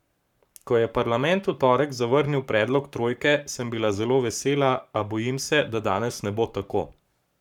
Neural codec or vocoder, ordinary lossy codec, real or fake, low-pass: codec, 44.1 kHz, 7.8 kbps, Pupu-Codec; none; fake; 19.8 kHz